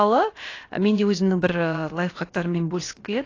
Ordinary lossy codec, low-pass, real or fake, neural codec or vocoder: AAC, 32 kbps; 7.2 kHz; fake; codec, 16 kHz, about 1 kbps, DyCAST, with the encoder's durations